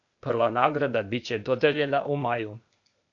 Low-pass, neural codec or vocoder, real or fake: 7.2 kHz; codec, 16 kHz, 0.8 kbps, ZipCodec; fake